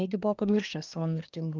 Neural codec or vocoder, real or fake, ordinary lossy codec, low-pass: codec, 24 kHz, 1 kbps, SNAC; fake; Opus, 24 kbps; 7.2 kHz